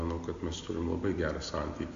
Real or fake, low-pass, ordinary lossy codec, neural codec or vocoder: real; 7.2 kHz; AAC, 48 kbps; none